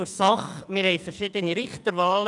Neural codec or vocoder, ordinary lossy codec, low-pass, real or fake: codec, 44.1 kHz, 2.6 kbps, SNAC; none; 10.8 kHz; fake